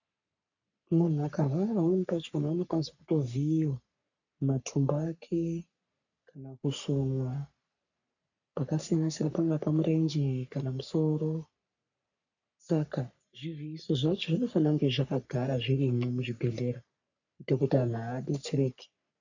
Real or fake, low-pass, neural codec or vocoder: fake; 7.2 kHz; codec, 44.1 kHz, 3.4 kbps, Pupu-Codec